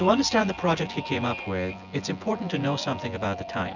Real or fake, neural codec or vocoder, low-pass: fake; vocoder, 24 kHz, 100 mel bands, Vocos; 7.2 kHz